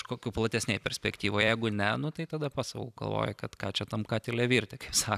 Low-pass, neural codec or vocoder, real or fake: 14.4 kHz; vocoder, 44.1 kHz, 128 mel bands every 256 samples, BigVGAN v2; fake